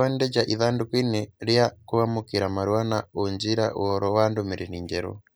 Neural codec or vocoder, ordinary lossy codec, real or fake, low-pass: none; none; real; none